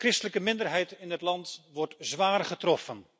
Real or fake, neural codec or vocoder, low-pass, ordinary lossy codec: real; none; none; none